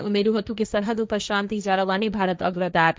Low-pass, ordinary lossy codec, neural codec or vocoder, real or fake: none; none; codec, 16 kHz, 1.1 kbps, Voila-Tokenizer; fake